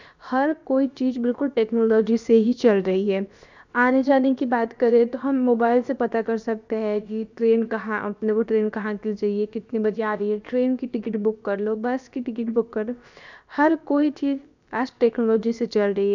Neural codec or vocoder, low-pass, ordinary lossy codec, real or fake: codec, 16 kHz, about 1 kbps, DyCAST, with the encoder's durations; 7.2 kHz; none; fake